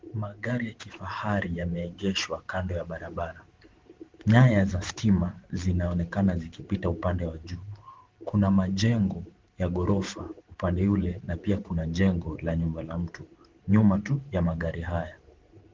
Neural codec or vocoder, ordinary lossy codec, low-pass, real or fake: vocoder, 44.1 kHz, 128 mel bands every 512 samples, BigVGAN v2; Opus, 16 kbps; 7.2 kHz; fake